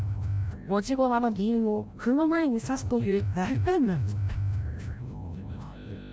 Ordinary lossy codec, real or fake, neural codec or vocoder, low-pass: none; fake; codec, 16 kHz, 0.5 kbps, FreqCodec, larger model; none